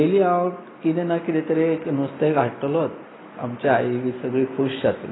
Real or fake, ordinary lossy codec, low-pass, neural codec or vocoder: real; AAC, 16 kbps; 7.2 kHz; none